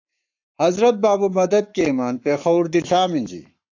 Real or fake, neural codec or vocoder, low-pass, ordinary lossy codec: fake; codec, 44.1 kHz, 7.8 kbps, Pupu-Codec; 7.2 kHz; AAC, 48 kbps